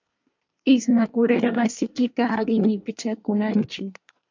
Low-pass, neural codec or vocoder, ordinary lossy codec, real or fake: 7.2 kHz; codec, 24 kHz, 1 kbps, SNAC; MP3, 64 kbps; fake